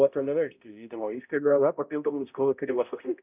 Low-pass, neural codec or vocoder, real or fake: 3.6 kHz; codec, 16 kHz, 0.5 kbps, X-Codec, HuBERT features, trained on balanced general audio; fake